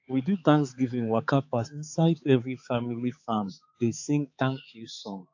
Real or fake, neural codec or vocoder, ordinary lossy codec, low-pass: fake; codec, 16 kHz, 4 kbps, X-Codec, HuBERT features, trained on balanced general audio; AAC, 48 kbps; 7.2 kHz